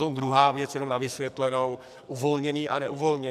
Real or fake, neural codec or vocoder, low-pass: fake; codec, 44.1 kHz, 2.6 kbps, SNAC; 14.4 kHz